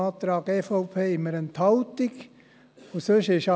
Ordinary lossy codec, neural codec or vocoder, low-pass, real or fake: none; none; none; real